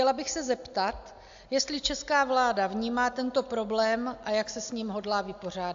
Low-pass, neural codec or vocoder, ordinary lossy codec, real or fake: 7.2 kHz; none; AAC, 64 kbps; real